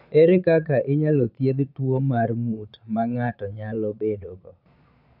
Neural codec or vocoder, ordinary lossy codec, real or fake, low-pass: vocoder, 22.05 kHz, 80 mel bands, Vocos; none; fake; 5.4 kHz